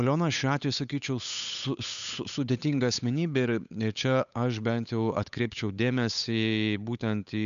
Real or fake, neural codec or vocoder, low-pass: fake; codec, 16 kHz, 8 kbps, FunCodec, trained on Chinese and English, 25 frames a second; 7.2 kHz